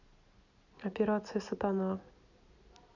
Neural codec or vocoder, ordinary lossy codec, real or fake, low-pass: none; none; real; 7.2 kHz